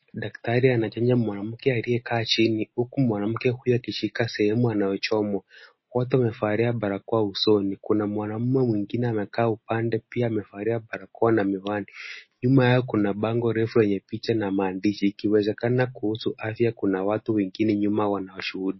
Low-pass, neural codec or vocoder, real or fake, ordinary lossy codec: 7.2 kHz; none; real; MP3, 24 kbps